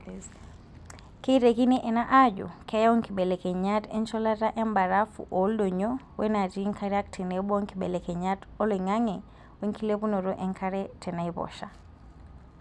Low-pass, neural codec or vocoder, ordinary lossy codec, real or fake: none; none; none; real